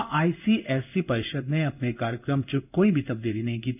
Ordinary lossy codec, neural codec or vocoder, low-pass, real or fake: none; codec, 16 kHz in and 24 kHz out, 1 kbps, XY-Tokenizer; 3.6 kHz; fake